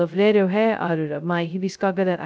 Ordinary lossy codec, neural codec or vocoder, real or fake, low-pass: none; codec, 16 kHz, 0.2 kbps, FocalCodec; fake; none